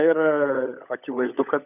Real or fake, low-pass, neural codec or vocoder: fake; 3.6 kHz; codec, 16 kHz, 16 kbps, FunCodec, trained on LibriTTS, 50 frames a second